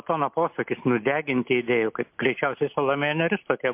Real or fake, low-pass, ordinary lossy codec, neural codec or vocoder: real; 3.6 kHz; MP3, 32 kbps; none